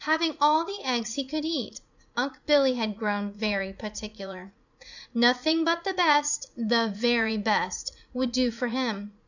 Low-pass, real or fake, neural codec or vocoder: 7.2 kHz; fake; vocoder, 44.1 kHz, 80 mel bands, Vocos